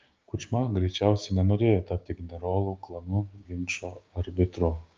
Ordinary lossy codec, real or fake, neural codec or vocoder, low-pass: Opus, 24 kbps; fake; codec, 16 kHz, 6 kbps, DAC; 7.2 kHz